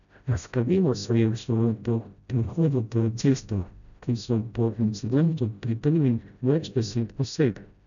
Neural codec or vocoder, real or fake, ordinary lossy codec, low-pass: codec, 16 kHz, 0.5 kbps, FreqCodec, smaller model; fake; none; 7.2 kHz